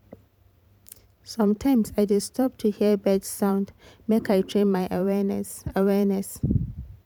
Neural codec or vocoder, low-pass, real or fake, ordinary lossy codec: vocoder, 44.1 kHz, 128 mel bands every 512 samples, BigVGAN v2; 19.8 kHz; fake; none